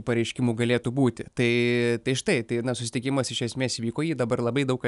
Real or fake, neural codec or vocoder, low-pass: real; none; 10.8 kHz